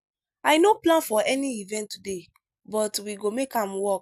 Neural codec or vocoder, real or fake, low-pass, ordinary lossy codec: none; real; 14.4 kHz; none